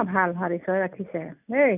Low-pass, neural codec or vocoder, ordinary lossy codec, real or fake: 3.6 kHz; none; none; real